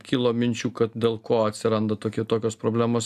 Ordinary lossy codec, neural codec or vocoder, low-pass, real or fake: AAC, 96 kbps; none; 14.4 kHz; real